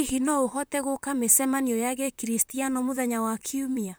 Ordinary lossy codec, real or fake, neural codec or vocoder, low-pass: none; fake; vocoder, 44.1 kHz, 128 mel bands, Pupu-Vocoder; none